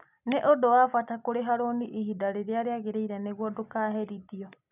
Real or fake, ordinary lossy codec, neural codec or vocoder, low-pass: real; none; none; 3.6 kHz